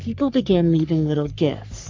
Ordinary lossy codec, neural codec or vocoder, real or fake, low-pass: MP3, 64 kbps; codec, 44.1 kHz, 3.4 kbps, Pupu-Codec; fake; 7.2 kHz